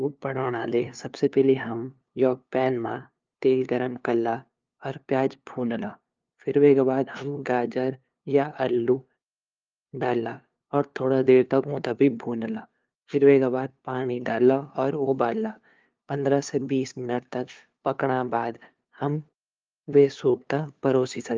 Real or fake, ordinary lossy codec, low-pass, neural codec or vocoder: fake; Opus, 24 kbps; 7.2 kHz; codec, 16 kHz, 2 kbps, FunCodec, trained on LibriTTS, 25 frames a second